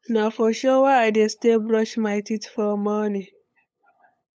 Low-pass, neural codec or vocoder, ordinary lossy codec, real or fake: none; codec, 16 kHz, 8 kbps, FunCodec, trained on LibriTTS, 25 frames a second; none; fake